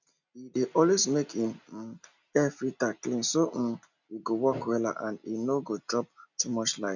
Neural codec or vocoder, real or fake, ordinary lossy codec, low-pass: none; real; none; 7.2 kHz